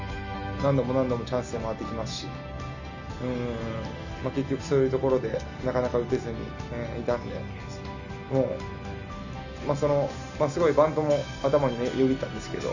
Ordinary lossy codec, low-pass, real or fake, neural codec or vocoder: none; 7.2 kHz; real; none